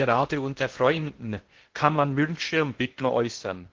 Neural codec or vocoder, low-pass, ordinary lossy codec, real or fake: codec, 16 kHz in and 24 kHz out, 0.6 kbps, FocalCodec, streaming, 2048 codes; 7.2 kHz; Opus, 16 kbps; fake